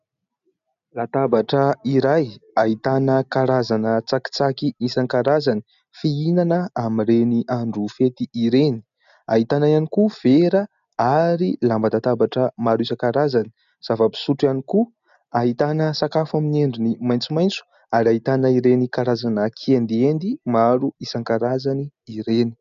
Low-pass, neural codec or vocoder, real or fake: 7.2 kHz; none; real